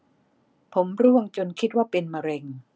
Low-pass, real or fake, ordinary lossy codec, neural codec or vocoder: none; real; none; none